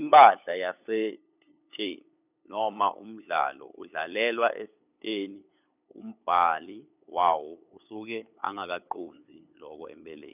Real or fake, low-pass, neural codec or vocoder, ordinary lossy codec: fake; 3.6 kHz; codec, 16 kHz, 8 kbps, FunCodec, trained on LibriTTS, 25 frames a second; none